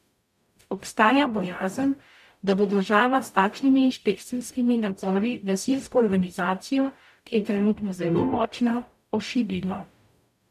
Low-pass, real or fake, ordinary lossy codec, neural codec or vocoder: 14.4 kHz; fake; none; codec, 44.1 kHz, 0.9 kbps, DAC